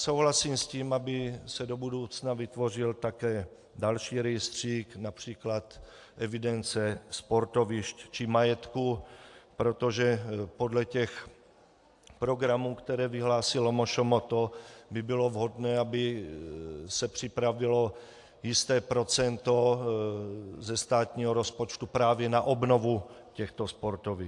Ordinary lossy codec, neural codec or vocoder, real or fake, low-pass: AAC, 64 kbps; none; real; 10.8 kHz